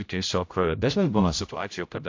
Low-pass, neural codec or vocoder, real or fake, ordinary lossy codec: 7.2 kHz; codec, 16 kHz, 0.5 kbps, X-Codec, HuBERT features, trained on general audio; fake; AAC, 48 kbps